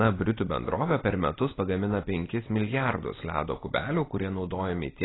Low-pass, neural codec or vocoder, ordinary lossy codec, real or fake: 7.2 kHz; none; AAC, 16 kbps; real